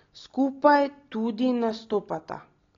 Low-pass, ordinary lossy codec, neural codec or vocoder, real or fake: 7.2 kHz; AAC, 32 kbps; none; real